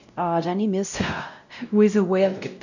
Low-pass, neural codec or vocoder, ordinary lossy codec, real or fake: 7.2 kHz; codec, 16 kHz, 0.5 kbps, X-Codec, WavLM features, trained on Multilingual LibriSpeech; none; fake